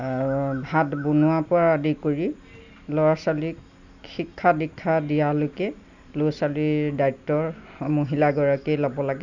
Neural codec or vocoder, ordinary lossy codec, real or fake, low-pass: none; none; real; 7.2 kHz